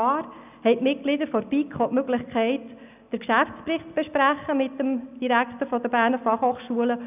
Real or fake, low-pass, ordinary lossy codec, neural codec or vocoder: real; 3.6 kHz; none; none